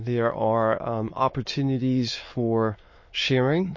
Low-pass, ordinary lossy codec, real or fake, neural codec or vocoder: 7.2 kHz; MP3, 32 kbps; fake; autoencoder, 22.05 kHz, a latent of 192 numbers a frame, VITS, trained on many speakers